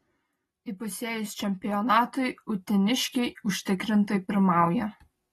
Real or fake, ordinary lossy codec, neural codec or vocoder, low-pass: real; AAC, 32 kbps; none; 19.8 kHz